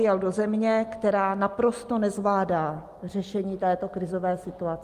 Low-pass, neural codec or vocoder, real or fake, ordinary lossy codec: 14.4 kHz; none; real; Opus, 32 kbps